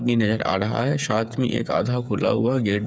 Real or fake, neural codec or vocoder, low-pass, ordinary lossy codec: fake; codec, 16 kHz, 8 kbps, FreqCodec, smaller model; none; none